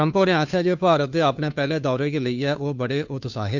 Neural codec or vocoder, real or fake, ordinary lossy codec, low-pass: codec, 16 kHz, 2 kbps, FunCodec, trained on Chinese and English, 25 frames a second; fake; none; 7.2 kHz